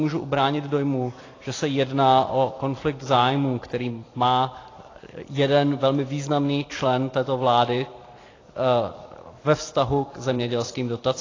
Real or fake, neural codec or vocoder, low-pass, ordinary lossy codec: fake; vocoder, 44.1 kHz, 128 mel bands every 256 samples, BigVGAN v2; 7.2 kHz; AAC, 32 kbps